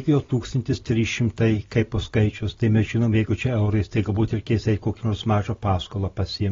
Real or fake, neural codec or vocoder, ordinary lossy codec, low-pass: real; none; AAC, 24 kbps; 7.2 kHz